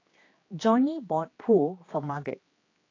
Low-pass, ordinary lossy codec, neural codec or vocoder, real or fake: 7.2 kHz; AAC, 32 kbps; codec, 16 kHz, 2 kbps, X-Codec, HuBERT features, trained on general audio; fake